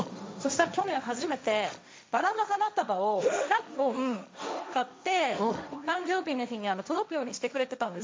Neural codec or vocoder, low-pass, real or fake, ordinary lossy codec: codec, 16 kHz, 1.1 kbps, Voila-Tokenizer; none; fake; none